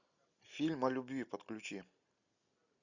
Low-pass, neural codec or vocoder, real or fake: 7.2 kHz; none; real